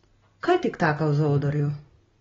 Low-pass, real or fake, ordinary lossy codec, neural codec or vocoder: 7.2 kHz; real; AAC, 24 kbps; none